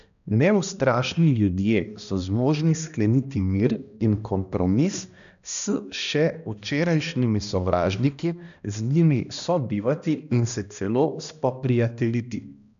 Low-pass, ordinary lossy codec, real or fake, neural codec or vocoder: 7.2 kHz; none; fake; codec, 16 kHz, 1 kbps, X-Codec, HuBERT features, trained on balanced general audio